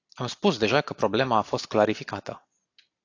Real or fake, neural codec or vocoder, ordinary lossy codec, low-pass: real; none; AAC, 48 kbps; 7.2 kHz